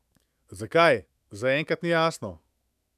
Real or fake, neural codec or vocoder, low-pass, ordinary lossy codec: fake; autoencoder, 48 kHz, 128 numbers a frame, DAC-VAE, trained on Japanese speech; 14.4 kHz; none